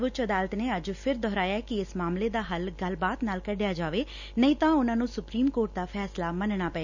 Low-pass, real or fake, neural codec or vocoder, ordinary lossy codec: 7.2 kHz; real; none; none